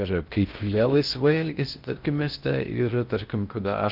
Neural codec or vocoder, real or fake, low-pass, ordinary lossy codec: codec, 16 kHz in and 24 kHz out, 0.6 kbps, FocalCodec, streaming, 2048 codes; fake; 5.4 kHz; Opus, 24 kbps